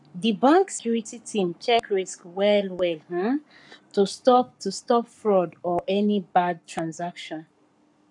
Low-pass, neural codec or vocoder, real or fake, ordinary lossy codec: 10.8 kHz; codec, 44.1 kHz, 7.8 kbps, Pupu-Codec; fake; none